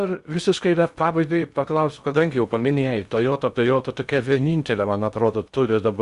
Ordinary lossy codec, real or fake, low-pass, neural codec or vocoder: Opus, 64 kbps; fake; 10.8 kHz; codec, 16 kHz in and 24 kHz out, 0.6 kbps, FocalCodec, streaming, 2048 codes